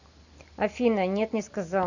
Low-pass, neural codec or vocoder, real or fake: 7.2 kHz; none; real